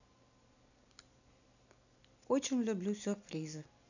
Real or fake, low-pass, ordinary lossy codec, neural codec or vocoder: real; 7.2 kHz; none; none